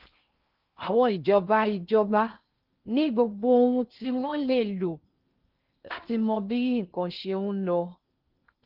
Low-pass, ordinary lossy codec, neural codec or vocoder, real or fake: 5.4 kHz; Opus, 24 kbps; codec, 16 kHz in and 24 kHz out, 0.6 kbps, FocalCodec, streaming, 4096 codes; fake